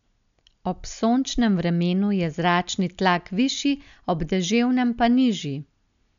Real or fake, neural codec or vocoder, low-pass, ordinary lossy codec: real; none; 7.2 kHz; none